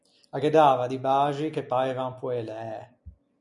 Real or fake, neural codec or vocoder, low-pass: real; none; 10.8 kHz